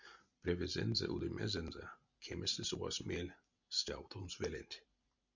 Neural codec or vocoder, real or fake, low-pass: none; real; 7.2 kHz